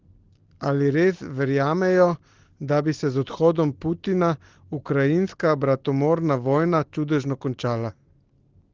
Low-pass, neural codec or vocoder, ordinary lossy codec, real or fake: 7.2 kHz; none; Opus, 16 kbps; real